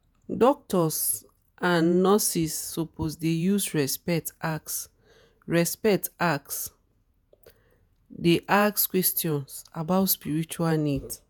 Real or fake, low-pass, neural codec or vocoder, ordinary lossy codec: fake; none; vocoder, 48 kHz, 128 mel bands, Vocos; none